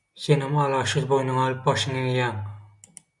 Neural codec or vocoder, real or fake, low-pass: none; real; 10.8 kHz